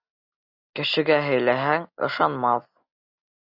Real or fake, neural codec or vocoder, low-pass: real; none; 5.4 kHz